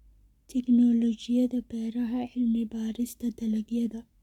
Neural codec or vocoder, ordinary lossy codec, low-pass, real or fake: codec, 44.1 kHz, 7.8 kbps, Pupu-Codec; none; 19.8 kHz; fake